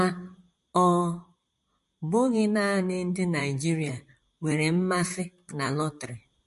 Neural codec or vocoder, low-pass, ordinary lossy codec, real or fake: vocoder, 44.1 kHz, 128 mel bands, Pupu-Vocoder; 14.4 kHz; MP3, 48 kbps; fake